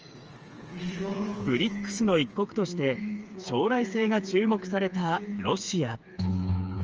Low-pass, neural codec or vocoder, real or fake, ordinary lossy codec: 7.2 kHz; codec, 16 kHz, 4 kbps, FreqCodec, smaller model; fake; Opus, 24 kbps